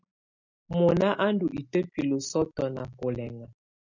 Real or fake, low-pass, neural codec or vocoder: real; 7.2 kHz; none